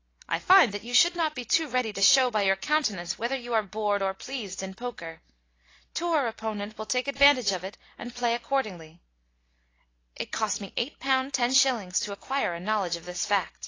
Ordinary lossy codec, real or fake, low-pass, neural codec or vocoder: AAC, 32 kbps; real; 7.2 kHz; none